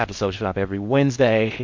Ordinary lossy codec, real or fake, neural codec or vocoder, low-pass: MP3, 64 kbps; fake; codec, 16 kHz in and 24 kHz out, 0.6 kbps, FocalCodec, streaming, 2048 codes; 7.2 kHz